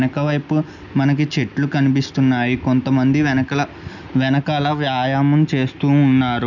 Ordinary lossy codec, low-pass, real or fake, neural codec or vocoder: none; 7.2 kHz; real; none